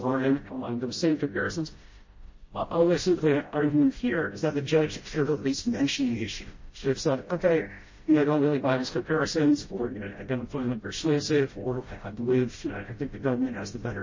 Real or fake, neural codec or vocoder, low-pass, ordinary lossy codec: fake; codec, 16 kHz, 0.5 kbps, FreqCodec, smaller model; 7.2 kHz; MP3, 32 kbps